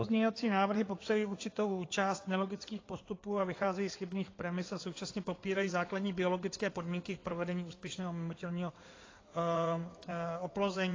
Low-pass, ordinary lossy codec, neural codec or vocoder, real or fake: 7.2 kHz; AAC, 32 kbps; codec, 16 kHz in and 24 kHz out, 2.2 kbps, FireRedTTS-2 codec; fake